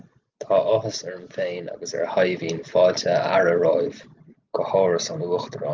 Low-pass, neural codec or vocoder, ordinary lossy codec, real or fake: 7.2 kHz; none; Opus, 32 kbps; real